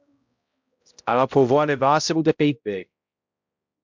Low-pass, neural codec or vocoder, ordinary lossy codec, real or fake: 7.2 kHz; codec, 16 kHz, 0.5 kbps, X-Codec, HuBERT features, trained on balanced general audio; MP3, 64 kbps; fake